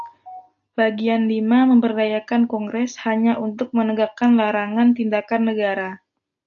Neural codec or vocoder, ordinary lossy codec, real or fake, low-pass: none; AAC, 64 kbps; real; 7.2 kHz